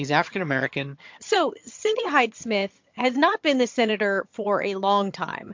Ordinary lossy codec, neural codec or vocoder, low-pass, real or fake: MP3, 48 kbps; vocoder, 22.05 kHz, 80 mel bands, HiFi-GAN; 7.2 kHz; fake